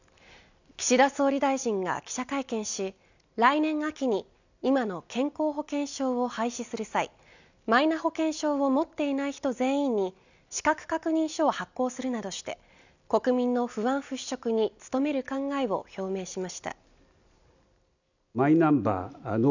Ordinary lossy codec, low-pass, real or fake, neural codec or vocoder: none; 7.2 kHz; real; none